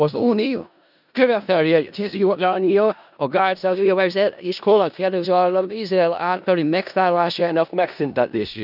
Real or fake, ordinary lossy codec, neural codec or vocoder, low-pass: fake; none; codec, 16 kHz in and 24 kHz out, 0.4 kbps, LongCat-Audio-Codec, four codebook decoder; 5.4 kHz